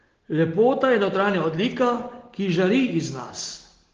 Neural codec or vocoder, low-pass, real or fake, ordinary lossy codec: none; 7.2 kHz; real; Opus, 16 kbps